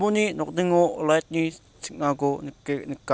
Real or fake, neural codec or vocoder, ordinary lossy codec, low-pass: real; none; none; none